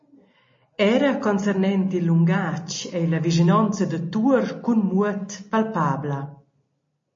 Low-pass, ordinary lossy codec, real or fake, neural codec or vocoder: 7.2 kHz; MP3, 32 kbps; real; none